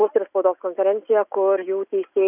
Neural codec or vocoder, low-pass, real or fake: none; 3.6 kHz; real